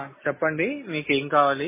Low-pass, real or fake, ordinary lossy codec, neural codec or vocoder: 3.6 kHz; real; MP3, 16 kbps; none